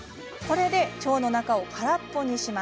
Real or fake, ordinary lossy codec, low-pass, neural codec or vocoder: real; none; none; none